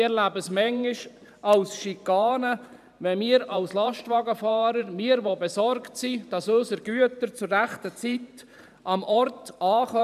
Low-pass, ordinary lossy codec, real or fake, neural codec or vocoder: 14.4 kHz; none; fake; vocoder, 44.1 kHz, 128 mel bands every 512 samples, BigVGAN v2